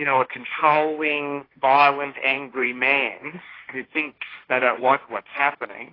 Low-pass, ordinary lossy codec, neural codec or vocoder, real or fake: 5.4 kHz; AAC, 24 kbps; codec, 16 kHz, 1.1 kbps, Voila-Tokenizer; fake